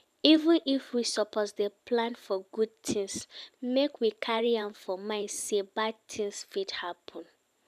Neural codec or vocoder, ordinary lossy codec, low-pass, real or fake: vocoder, 44.1 kHz, 128 mel bands every 512 samples, BigVGAN v2; none; 14.4 kHz; fake